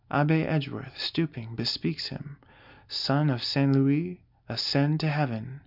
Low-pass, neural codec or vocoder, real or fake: 5.4 kHz; none; real